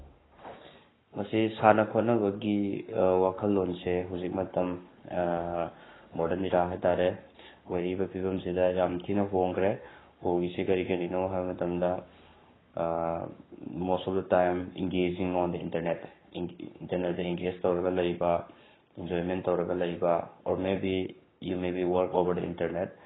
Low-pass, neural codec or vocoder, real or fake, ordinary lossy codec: 7.2 kHz; codec, 44.1 kHz, 7.8 kbps, Pupu-Codec; fake; AAC, 16 kbps